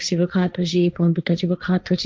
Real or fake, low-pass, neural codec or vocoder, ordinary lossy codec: fake; none; codec, 16 kHz, 1.1 kbps, Voila-Tokenizer; none